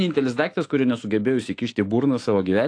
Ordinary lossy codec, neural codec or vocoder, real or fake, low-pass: AAC, 48 kbps; autoencoder, 48 kHz, 128 numbers a frame, DAC-VAE, trained on Japanese speech; fake; 9.9 kHz